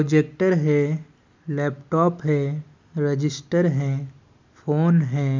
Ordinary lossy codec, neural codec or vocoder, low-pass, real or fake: MP3, 64 kbps; none; 7.2 kHz; real